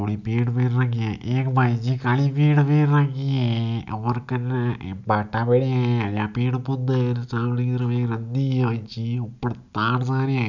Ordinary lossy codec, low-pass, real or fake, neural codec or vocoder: none; 7.2 kHz; real; none